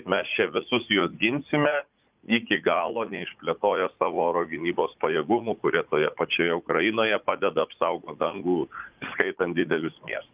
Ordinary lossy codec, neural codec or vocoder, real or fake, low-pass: Opus, 24 kbps; vocoder, 44.1 kHz, 80 mel bands, Vocos; fake; 3.6 kHz